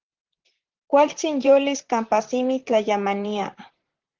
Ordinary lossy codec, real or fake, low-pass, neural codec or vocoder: Opus, 16 kbps; fake; 7.2 kHz; vocoder, 44.1 kHz, 128 mel bands, Pupu-Vocoder